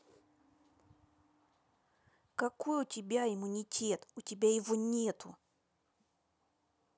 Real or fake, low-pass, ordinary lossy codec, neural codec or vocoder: real; none; none; none